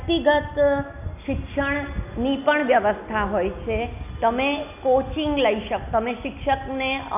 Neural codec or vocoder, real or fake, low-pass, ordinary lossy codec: none; real; 3.6 kHz; none